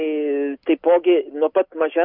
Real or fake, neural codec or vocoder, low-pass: real; none; 5.4 kHz